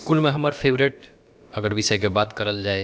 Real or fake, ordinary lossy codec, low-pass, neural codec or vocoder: fake; none; none; codec, 16 kHz, about 1 kbps, DyCAST, with the encoder's durations